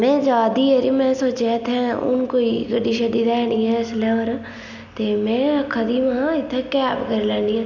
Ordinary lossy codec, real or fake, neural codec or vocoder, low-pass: none; real; none; 7.2 kHz